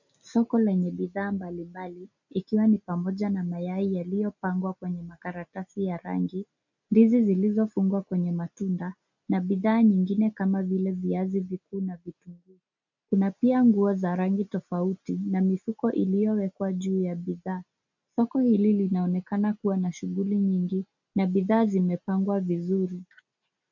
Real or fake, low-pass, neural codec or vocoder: real; 7.2 kHz; none